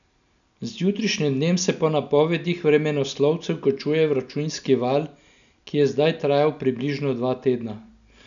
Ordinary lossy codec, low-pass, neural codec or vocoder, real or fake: none; 7.2 kHz; none; real